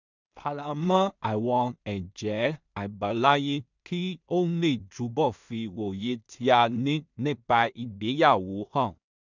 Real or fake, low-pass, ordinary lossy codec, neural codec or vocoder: fake; 7.2 kHz; none; codec, 16 kHz in and 24 kHz out, 0.4 kbps, LongCat-Audio-Codec, two codebook decoder